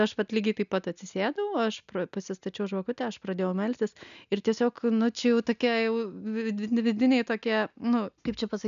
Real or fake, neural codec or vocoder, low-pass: real; none; 7.2 kHz